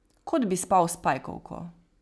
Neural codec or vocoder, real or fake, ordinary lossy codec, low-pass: none; real; none; none